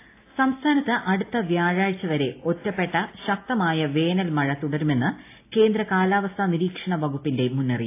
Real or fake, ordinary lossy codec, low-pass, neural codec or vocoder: real; AAC, 24 kbps; 3.6 kHz; none